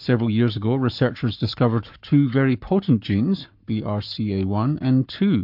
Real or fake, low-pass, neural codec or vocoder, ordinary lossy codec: fake; 5.4 kHz; codec, 44.1 kHz, 7.8 kbps, Pupu-Codec; MP3, 48 kbps